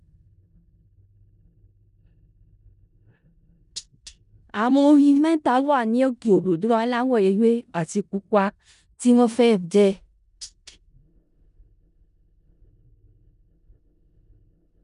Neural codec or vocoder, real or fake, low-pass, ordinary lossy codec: codec, 16 kHz in and 24 kHz out, 0.4 kbps, LongCat-Audio-Codec, four codebook decoder; fake; 10.8 kHz; none